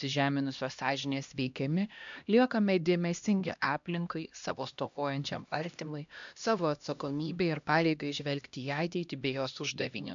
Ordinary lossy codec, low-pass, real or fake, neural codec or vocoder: MP3, 64 kbps; 7.2 kHz; fake; codec, 16 kHz, 1 kbps, X-Codec, HuBERT features, trained on LibriSpeech